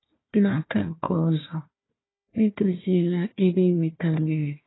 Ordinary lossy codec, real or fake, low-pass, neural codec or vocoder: AAC, 16 kbps; fake; 7.2 kHz; codec, 16 kHz, 1 kbps, FunCodec, trained on Chinese and English, 50 frames a second